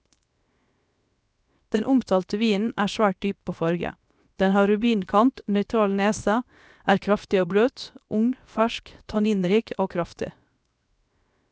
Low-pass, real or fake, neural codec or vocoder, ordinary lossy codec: none; fake; codec, 16 kHz, 0.7 kbps, FocalCodec; none